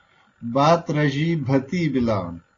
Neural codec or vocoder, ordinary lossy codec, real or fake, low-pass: none; AAC, 32 kbps; real; 7.2 kHz